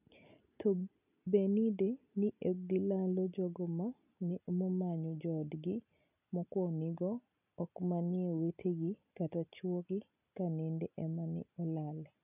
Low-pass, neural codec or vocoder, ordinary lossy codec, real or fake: 3.6 kHz; none; none; real